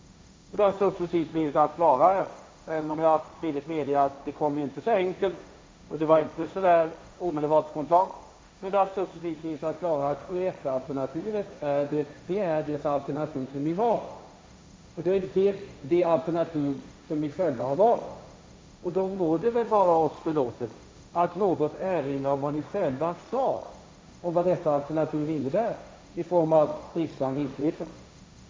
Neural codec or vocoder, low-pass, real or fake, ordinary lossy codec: codec, 16 kHz, 1.1 kbps, Voila-Tokenizer; none; fake; none